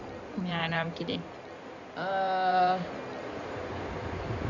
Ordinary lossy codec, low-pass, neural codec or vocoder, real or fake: none; 7.2 kHz; codec, 16 kHz in and 24 kHz out, 2.2 kbps, FireRedTTS-2 codec; fake